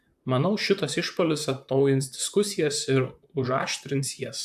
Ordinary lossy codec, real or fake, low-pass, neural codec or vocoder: AAC, 96 kbps; fake; 14.4 kHz; vocoder, 44.1 kHz, 128 mel bands, Pupu-Vocoder